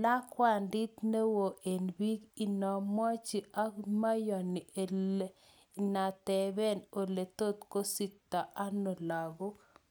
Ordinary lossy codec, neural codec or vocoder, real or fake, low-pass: none; none; real; none